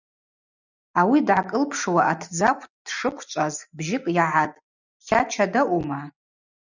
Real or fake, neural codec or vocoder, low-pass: real; none; 7.2 kHz